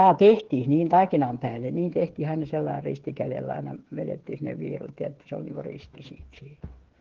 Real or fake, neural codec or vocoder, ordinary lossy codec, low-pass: fake; codec, 16 kHz, 8 kbps, FreqCodec, smaller model; Opus, 16 kbps; 7.2 kHz